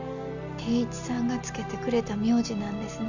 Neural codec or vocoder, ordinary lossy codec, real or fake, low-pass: none; none; real; 7.2 kHz